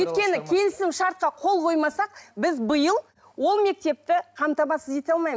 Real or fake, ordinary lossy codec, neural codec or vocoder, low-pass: real; none; none; none